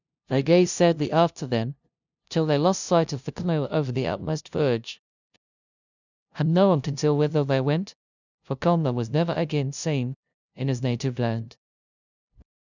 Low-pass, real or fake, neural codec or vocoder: 7.2 kHz; fake; codec, 16 kHz, 0.5 kbps, FunCodec, trained on LibriTTS, 25 frames a second